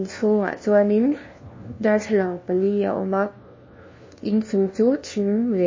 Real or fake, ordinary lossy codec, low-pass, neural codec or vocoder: fake; MP3, 32 kbps; 7.2 kHz; codec, 16 kHz, 1 kbps, FunCodec, trained on LibriTTS, 50 frames a second